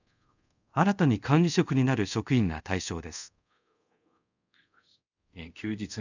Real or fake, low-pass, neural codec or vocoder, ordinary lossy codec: fake; 7.2 kHz; codec, 24 kHz, 0.5 kbps, DualCodec; none